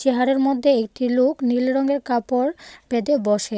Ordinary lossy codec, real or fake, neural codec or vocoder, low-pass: none; real; none; none